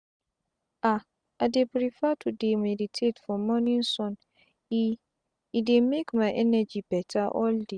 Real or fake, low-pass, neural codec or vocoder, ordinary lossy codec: real; 9.9 kHz; none; Opus, 64 kbps